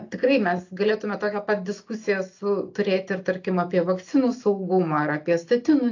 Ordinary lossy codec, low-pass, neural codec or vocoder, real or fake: AAC, 48 kbps; 7.2 kHz; none; real